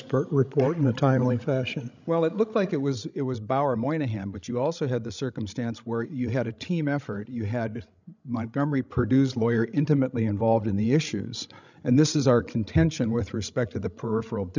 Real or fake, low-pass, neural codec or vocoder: fake; 7.2 kHz; codec, 16 kHz, 16 kbps, FreqCodec, larger model